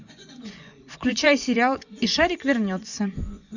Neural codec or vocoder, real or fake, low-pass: vocoder, 24 kHz, 100 mel bands, Vocos; fake; 7.2 kHz